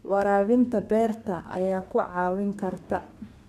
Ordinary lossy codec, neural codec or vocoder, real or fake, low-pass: none; codec, 32 kHz, 1.9 kbps, SNAC; fake; 14.4 kHz